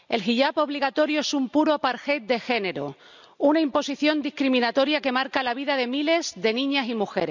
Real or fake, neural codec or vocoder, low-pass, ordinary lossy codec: real; none; 7.2 kHz; none